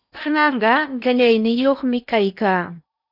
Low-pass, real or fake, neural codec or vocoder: 5.4 kHz; fake; codec, 16 kHz in and 24 kHz out, 0.8 kbps, FocalCodec, streaming, 65536 codes